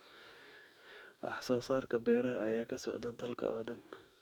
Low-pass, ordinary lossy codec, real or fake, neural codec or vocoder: 19.8 kHz; none; fake; codec, 44.1 kHz, 2.6 kbps, DAC